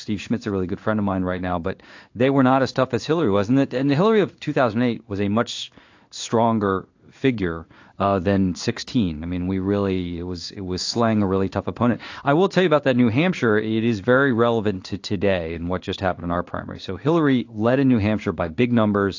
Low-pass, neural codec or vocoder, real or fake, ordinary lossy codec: 7.2 kHz; codec, 16 kHz in and 24 kHz out, 1 kbps, XY-Tokenizer; fake; AAC, 48 kbps